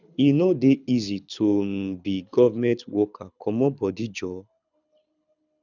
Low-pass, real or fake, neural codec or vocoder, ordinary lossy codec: 7.2 kHz; fake; codec, 24 kHz, 6 kbps, HILCodec; none